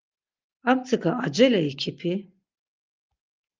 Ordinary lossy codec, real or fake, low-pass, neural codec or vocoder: Opus, 24 kbps; real; 7.2 kHz; none